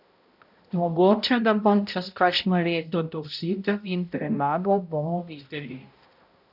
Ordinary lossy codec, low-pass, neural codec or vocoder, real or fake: none; 5.4 kHz; codec, 16 kHz, 0.5 kbps, X-Codec, HuBERT features, trained on balanced general audio; fake